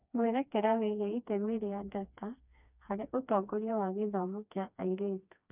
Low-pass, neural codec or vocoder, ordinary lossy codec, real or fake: 3.6 kHz; codec, 16 kHz, 2 kbps, FreqCodec, smaller model; none; fake